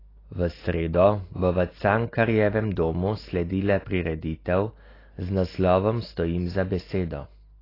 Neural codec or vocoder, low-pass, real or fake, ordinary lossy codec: none; 5.4 kHz; real; AAC, 24 kbps